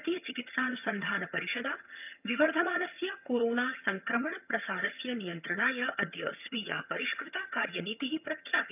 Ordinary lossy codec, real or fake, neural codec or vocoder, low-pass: none; fake; vocoder, 22.05 kHz, 80 mel bands, HiFi-GAN; 3.6 kHz